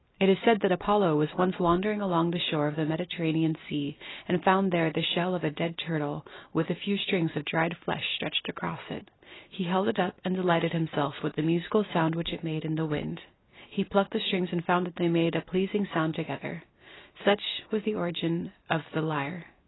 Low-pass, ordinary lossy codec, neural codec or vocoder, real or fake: 7.2 kHz; AAC, 16 kbps; none; real